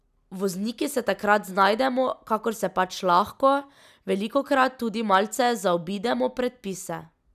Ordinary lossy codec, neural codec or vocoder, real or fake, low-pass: none; none; real; 14.4 kHz